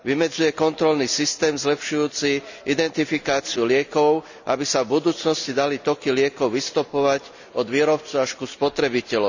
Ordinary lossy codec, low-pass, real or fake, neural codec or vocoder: none; 7.2 kHz; real; none